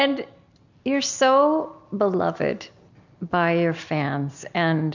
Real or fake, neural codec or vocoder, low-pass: real; none; 7.2 kHz